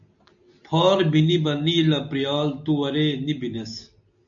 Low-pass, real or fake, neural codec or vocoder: 7.2 kHz; real; none